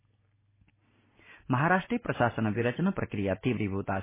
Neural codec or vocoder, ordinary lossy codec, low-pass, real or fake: none; MP3, 16 kbps; 3.6 kHz; real